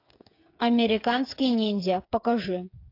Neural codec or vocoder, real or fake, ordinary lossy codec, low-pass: codec, 16 kHz, 8 kbps, FreqCodec, smaller model; fake; AAC, 32 kbps; 5.4 kHz